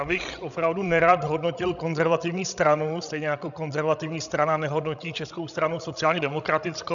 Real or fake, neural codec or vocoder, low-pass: fake; codec, 16 kHz, 16 kbps, FreqCodec, larger model; 7.2 kHz